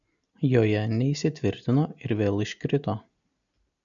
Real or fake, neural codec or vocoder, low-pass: real; none; 7.2 kHz